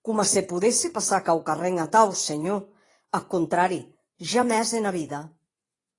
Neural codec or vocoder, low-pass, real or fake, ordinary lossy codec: vocoder, 24 kHz, 100 mel bands, Vocos; 10.8 kHz; fake; AAC, 32 kbps